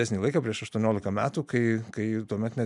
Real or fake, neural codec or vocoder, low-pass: real; none; 10.8 kHz